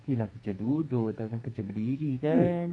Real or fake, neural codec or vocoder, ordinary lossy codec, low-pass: fake; codec, 44.1 kHz, 2.6 kbps, SNAC; none; 9.9 kHz